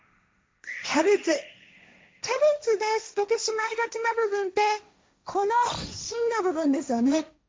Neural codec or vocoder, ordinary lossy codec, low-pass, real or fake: codec, 16 kHz, 1.1 kbps, Voila-Tokenizer; none; none; fake